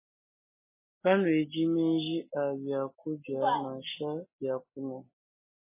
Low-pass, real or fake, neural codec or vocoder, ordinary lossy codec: 3.6 kHz; real; none; MP3, 16 kbps